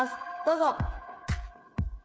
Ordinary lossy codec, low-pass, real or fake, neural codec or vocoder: none; none; fake; codec, 16 kHz, 8 kbps, FreqCodec, smaller model